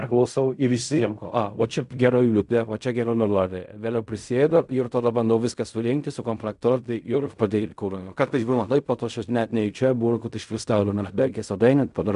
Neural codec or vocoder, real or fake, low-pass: codec, 16 kHz in and 24 kHz out, 0.4 kbps, LongCat-Audio-Codec, fine tuned four codebook decoder; fake; 10.8 kHz